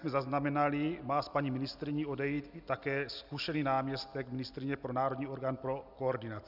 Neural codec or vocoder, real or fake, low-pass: none; real; 5.4 kHz